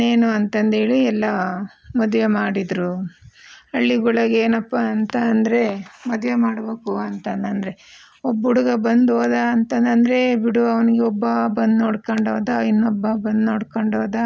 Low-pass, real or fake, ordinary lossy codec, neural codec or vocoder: none; real; none; none